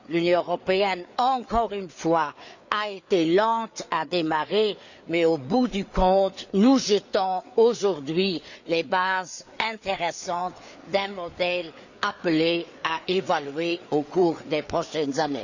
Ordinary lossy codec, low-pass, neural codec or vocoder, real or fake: none; 7.2 kHz; codec, 16 kHz, 4 kbps, FreqCodec, larger model; fake